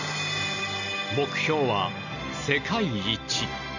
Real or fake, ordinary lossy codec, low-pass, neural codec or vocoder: real; none; 7.2 kHz; none